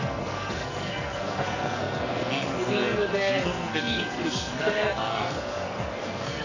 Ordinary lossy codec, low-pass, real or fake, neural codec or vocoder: none; 7.2 kHz; fake; codec, 44.1 kHz, 2.6 kbps, SNAC